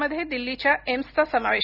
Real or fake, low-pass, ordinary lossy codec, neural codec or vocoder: real; 5.4 kHz; none; none